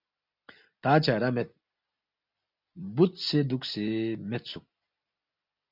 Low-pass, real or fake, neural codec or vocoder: 5.4 kHz; real; none